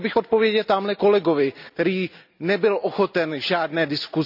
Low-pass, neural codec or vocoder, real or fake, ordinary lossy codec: 5.4 kHz; none; real; none